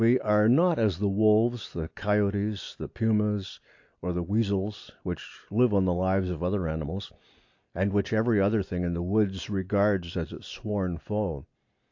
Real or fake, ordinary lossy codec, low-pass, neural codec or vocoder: real; AAC, 48 kbps; 7.2 kHz; none